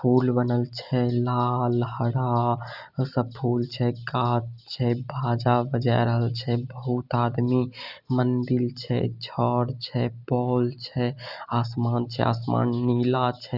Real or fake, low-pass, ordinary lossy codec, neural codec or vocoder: real; 5.4 kHz; Opus, 64 kbps; none